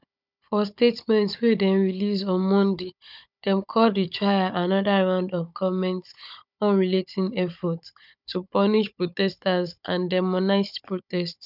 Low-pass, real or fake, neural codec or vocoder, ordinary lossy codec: 5.4 kHz; fake; codec, 16 kHz, 16 kbps, FunCodec, trained on Chinese and English, 50 frames a second; none